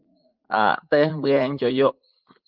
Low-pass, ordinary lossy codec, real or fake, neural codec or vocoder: 5.4 kHz; Opus, 24 kbps; fake; vocoder, 44.1 kHz, 80 mel bands, Vocos